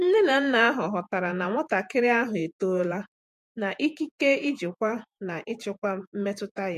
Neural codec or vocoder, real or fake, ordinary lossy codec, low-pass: vocoder, 48 kHz, 128 mel bands, Vocos; fake; MP3, 64 kbps; 14.4 kHz